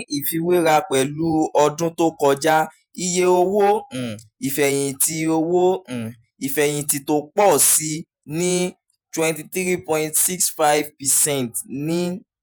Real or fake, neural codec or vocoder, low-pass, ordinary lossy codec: fake; vocoder, 48 kHz, 128 mel bands, Vocos; none; none